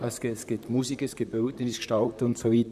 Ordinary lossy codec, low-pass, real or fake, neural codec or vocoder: none; 14.4 kHz; fake; vocoder, 44.1 kHz, 128 mel bands, Pupu-Vocoder